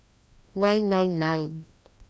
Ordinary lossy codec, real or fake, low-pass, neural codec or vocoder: none; fake; none; codec, 16 kHz, 1 kbps, FreqCodec, larger model